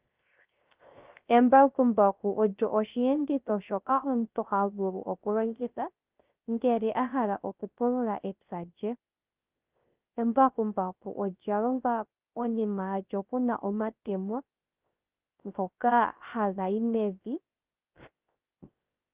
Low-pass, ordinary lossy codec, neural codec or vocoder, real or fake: 3.6 kHz; Opus, 32 kbps; codec, 16 kHz, 0.3 kbps, FocalCodec; fake